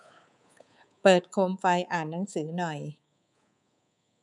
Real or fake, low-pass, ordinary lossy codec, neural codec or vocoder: fake; 10.8 kHz; none; codec, 24 kHz, 3.1 kbps, DualCodec